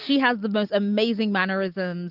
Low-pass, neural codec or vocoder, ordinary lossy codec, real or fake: 5.4 kHz; none; Opus, 24 kbps; real